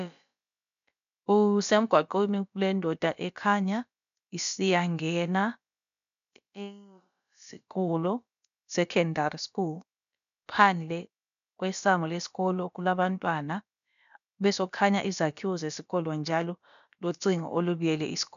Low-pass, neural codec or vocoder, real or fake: 7.2 kHz; codec, 16 kHz, about 1 kbps, DyCAST, with the encoder's durations; fake